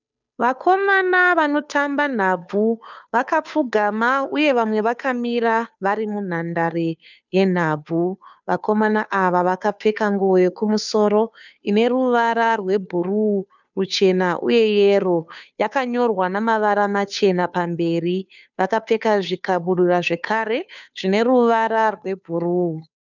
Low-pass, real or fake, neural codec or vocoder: 7.2 kHz; fake; codec, 16 kHz, 2 kbps, FunCodec, trained on Chinese and English, 25 frames a second